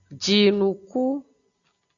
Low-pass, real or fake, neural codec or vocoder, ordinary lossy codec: 7.2 kHz; real; none; Opus, 64 kbps